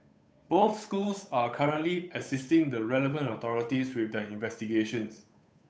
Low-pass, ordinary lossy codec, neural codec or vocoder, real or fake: none; none; codec, 16 kHz, 8 kbps, FunCodec, trained on Chinese and English, 25 frames a second; fake